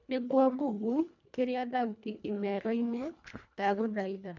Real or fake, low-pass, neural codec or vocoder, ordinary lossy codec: fake; 7.2 kHz; codec, 24 kHz, 1.5 kbps, HILCodec; none